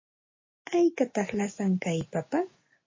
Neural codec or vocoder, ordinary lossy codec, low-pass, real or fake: none; MP3, 32 kbps; 7.2 kHz; real